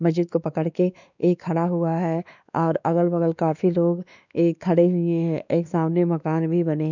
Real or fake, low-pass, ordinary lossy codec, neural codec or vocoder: fake; 7.2 kHz; none; codec, 16 kHz, 2 kbps, X-Codec, WavLM features, trained on Multilingual LibriSpeech